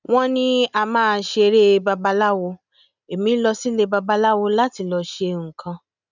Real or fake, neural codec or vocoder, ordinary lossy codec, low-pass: real; none; none; 7.2 kHz